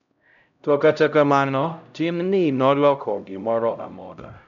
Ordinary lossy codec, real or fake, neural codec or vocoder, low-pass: none; fake; codec, 16 kHz, 0.5 kbps, X-Codec, HuBERT features, trained on LibriSpeech; 7.2 kHz